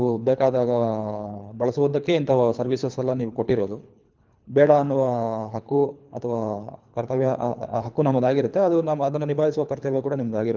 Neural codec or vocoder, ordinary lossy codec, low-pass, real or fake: codec, 24 kHz, 3 kbps, HILCodec; Opus, 24 kbps; 7.2 kHz; fake